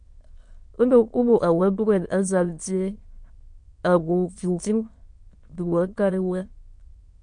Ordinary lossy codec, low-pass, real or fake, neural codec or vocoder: MP3, 48 kbps; 9.9 kHz; fake; autoencoder, 22.05 kHz, a latent of 192 numbers a frame, VITS, trained on many speakers